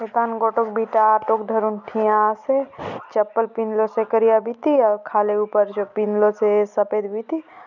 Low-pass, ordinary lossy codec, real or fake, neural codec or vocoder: 7.2 kHz; none; real; none